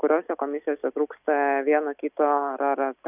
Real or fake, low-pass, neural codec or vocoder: real; 3.6 kHz; none